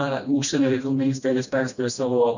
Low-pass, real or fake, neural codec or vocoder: 7.2 kHz; fake; codec, 16 kHz, 1 kbps, FreqCodec, smaller model